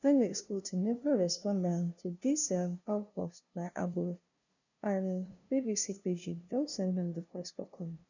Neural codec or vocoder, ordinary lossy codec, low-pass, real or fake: codec, 16 kHz, 0.5 kbps, FunCodec, trained on LibriTTS, 25 frames a second; none; 7.2 kHz; fake